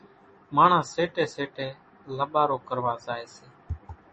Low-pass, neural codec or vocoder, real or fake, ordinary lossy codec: 10.8 kHz; none; real; MP3, 32 kbps